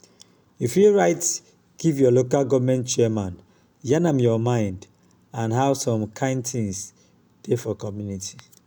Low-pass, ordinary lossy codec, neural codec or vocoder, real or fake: none; none; none; real